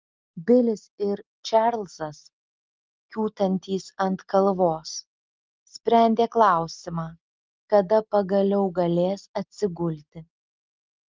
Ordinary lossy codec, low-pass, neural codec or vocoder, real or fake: Opus, 32 kbps; 7.2 kHz; none; real